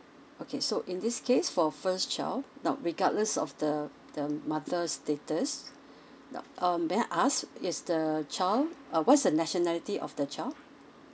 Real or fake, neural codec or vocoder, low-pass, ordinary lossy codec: real; none; none; none